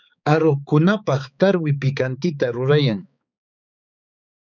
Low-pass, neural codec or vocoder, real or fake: 7.2 kHz; codec, 16 kHz, 4 kbps, X-Codec, HuBERT features, trained on balanced general audio; fake